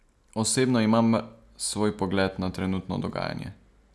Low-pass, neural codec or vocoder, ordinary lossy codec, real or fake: none; none; none; real